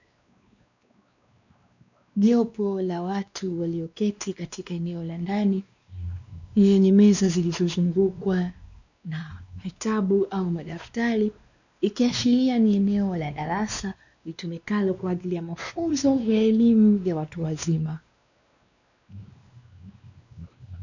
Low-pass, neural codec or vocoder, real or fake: 7.2 kHz; codec, 16 kHz, 2 kbps, X-Codec, WavLM features, trained on Multilingual LibriSpeech; fake